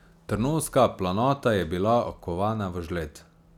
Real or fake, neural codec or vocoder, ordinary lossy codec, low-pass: real; none; none; 19.8 kHz